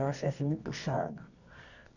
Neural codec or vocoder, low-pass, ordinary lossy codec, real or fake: codec, 24 kHz, 0.9 kbps, WavTokenizer, medium music audio release; 7.2 kHz; none; fake